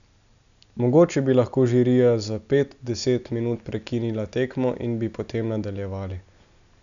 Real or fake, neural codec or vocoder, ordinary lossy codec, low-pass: real; none; none; 7.2 kHz